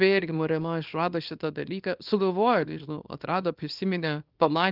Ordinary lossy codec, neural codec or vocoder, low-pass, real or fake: Opus, 32 kbps; codec, 24 kHz, 0.9 kbps, WavTokenizer, small release; 5.4 kHz; fake